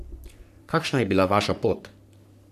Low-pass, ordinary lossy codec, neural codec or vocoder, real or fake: 14.4 kHz; none; codec, 44.1 kHz, 3.4 kbps, Pupu-Codec; fake